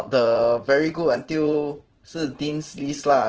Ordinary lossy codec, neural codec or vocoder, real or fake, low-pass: Opus, 16 kbps; vocoder, 44.1 kHz, 80 mel bands, Vocos; fake; 7.2 kHz